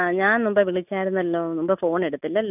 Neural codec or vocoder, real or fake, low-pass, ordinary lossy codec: none; real; 3.6 kHz; none